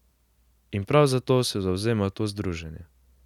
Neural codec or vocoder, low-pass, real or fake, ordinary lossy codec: none; 19.8 kHz; real; none